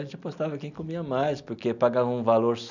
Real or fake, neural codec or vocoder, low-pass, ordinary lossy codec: real; none; 7.2 kHz; none